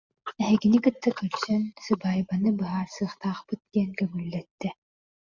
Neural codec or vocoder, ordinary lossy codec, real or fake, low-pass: none; Opus, 64 kbps; real; 7.2 kHz